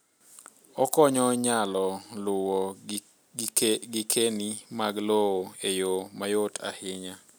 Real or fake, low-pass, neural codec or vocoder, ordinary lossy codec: real; none; none; none